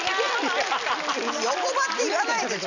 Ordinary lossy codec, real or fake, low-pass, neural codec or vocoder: none; real; 7.2 kHz; none